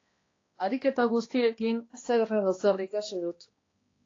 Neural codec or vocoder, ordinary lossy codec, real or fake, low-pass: codec, 16 kHz, 1 kbps, X-Codec, HuBERT features, trained on balanced general audio; AAC, 32 kbps; fake; 7.2 kHz